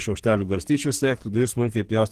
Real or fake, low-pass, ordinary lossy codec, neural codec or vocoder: fake; 14.4 kHz; Opus, 16 kbps; codec, 44.1 kHz, 2.6 kbps, SNAC